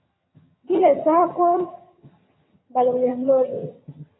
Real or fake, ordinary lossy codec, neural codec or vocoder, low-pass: fake; AAC, 16 kbps; vocoder, 22.05 kHz, 80 mel bands, HiFi-GAN; 7.2 kHz